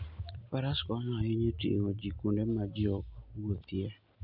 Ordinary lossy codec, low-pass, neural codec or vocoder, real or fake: none; 5.4 kHz; none; real